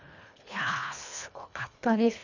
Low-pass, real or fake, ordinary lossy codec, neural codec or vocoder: 7.2 kHz; fake; none; codec, 24 kHz, 1.5 kbps, HILCodec